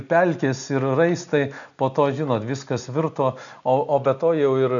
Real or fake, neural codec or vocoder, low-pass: real; none; 7.2 kHz